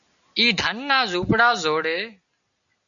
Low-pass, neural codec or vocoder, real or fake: 7.2 kHz; none; real